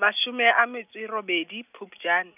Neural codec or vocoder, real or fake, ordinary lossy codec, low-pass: none; real; none; 3.6 kHz